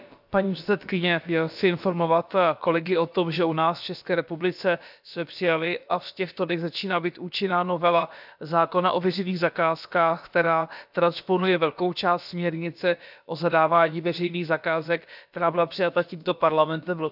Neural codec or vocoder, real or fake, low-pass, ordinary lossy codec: codec, 16 kHz, about 1 kbps, DyCAST, with the encoder's durations; fake; 5.4 kHz; none